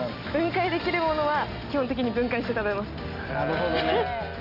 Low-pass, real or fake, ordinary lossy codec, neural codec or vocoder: 5.4 kHz; real; none; none